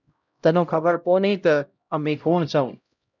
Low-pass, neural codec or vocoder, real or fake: 7.2 kHz; codec, 16 kHz, 0.5 kbps, X-Codec, HuBERT features, trained on LibriSpeech; fake